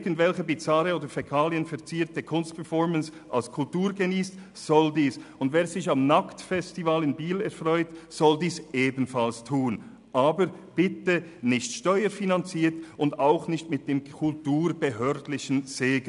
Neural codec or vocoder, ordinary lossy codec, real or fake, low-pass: none; none; real; 10.8 kHz